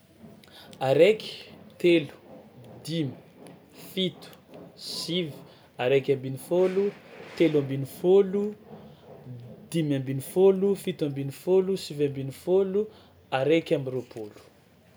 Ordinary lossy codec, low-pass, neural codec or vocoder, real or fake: none; none; none; real